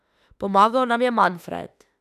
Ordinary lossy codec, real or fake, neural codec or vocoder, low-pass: none; fake; autoencoder, 48 kHz, 32 numbers a frame, DAC-VAE, trained on Japanese speech; 14.4 kHz